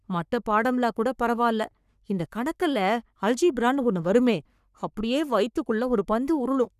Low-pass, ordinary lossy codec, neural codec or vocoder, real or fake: 14.4 kHz; none; codec, 44.1 kHz, 3.4 kbps, Pupu-Codec; fake